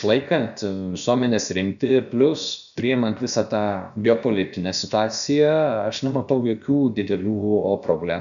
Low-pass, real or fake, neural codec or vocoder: 7.2 kHz; fake; codec, 16 kHz, about 1 kbps, DyCAST, with the encoder's durations